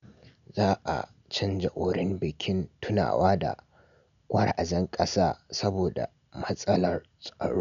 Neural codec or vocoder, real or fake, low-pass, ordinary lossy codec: none; real; 7.2 kHz; none